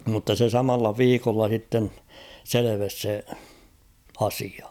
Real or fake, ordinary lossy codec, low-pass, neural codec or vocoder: real; none; 19.8 kHz; none